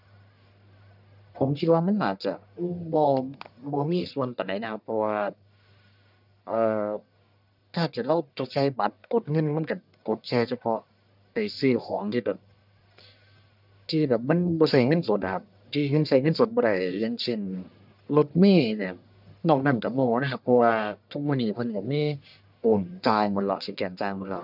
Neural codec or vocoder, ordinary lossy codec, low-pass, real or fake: codec, 44.1 kHz, 1.7 kbps, Pupu-Codec; none; 5.4 kHz; fake